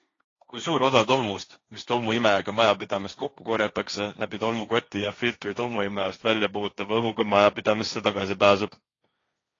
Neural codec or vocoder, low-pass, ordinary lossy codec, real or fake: codec, 16 kHz, 1.1 kbps, Voila-Tokenizer; 7.2 kHz; AAC, 32 kbps; fake